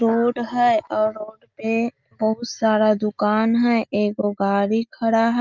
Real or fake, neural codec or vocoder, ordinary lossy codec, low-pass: real; none; Opus, 24 kbps; 7.2 kHz